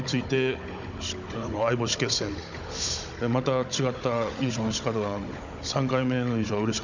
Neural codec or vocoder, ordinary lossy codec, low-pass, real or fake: codec, 16 kHz, 16 kbps, FunCodec, trained on Chinese and English, 50 frames a second; none; 7.2 kHz; fake